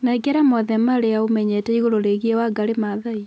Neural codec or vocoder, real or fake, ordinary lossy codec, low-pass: none; real; none; none